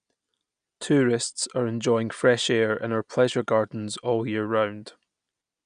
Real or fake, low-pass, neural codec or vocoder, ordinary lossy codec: real; 9.9 kHz; none; none